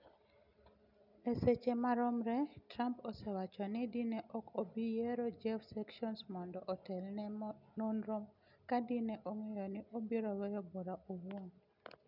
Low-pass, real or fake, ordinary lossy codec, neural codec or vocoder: 5.4 kHz; real; none; none